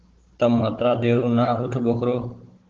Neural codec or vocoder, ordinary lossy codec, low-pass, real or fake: codec, 16 kHz, 16 kbps, FunCodec, trained on Chinese and English, 50 frames a second; Opus, 16 kbps; 7.2 kHz; fake